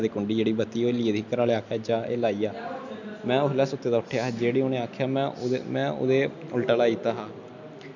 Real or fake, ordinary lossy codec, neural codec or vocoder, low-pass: real; none; none; 7.2 kHz